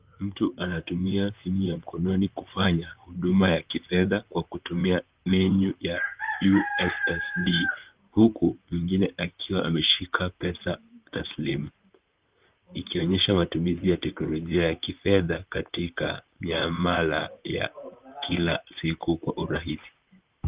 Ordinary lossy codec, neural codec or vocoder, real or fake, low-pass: Opus, 32 kbps; vocoder, 44.1 kHz, 128 mel bands, Pupu-Vocoder; fake; 3.6 kHz